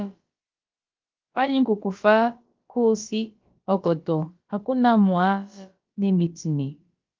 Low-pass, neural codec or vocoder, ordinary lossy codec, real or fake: 7.2 kHz; codec, 16 kHz, about 1 kbps, DyCAST, with the encoder's durations; Opus, 24 kbps; fake